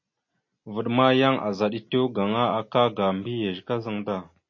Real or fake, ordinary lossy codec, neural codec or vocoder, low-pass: real; MP3, 32 kbps; none; 7.2 kHz